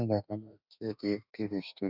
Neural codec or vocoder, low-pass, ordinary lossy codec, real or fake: autoencoder, 48 kHz, 32 numbers a frame, DAC-VAE, trained on Japanese speech; 5.4 kHz; none; fake